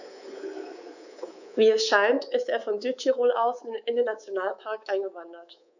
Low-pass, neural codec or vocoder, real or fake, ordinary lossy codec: 7.2 kHz; codec, 24 kHz, 3.1 kbps, DualCodec; fake; none